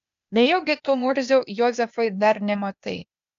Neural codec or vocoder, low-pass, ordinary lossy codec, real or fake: codec, 16 kHz, 0.8 kbps, ZipCodec; 7.2 kHz; MP3, 64 kbps; fake